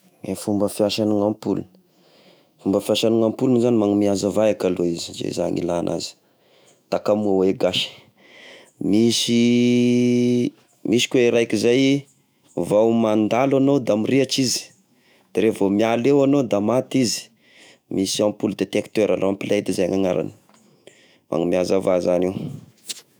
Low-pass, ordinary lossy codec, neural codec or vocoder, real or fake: none; none; autoencoder, 48 kHz, 128 numbers a frame, DAC-VAE, trained on Japanese speech; fake